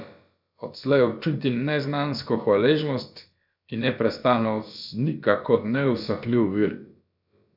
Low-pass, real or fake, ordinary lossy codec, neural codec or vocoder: 5.4 kHz; fake; none; codec, 16 kHz, about 1 kbps, DyCAST, with the encoder's durations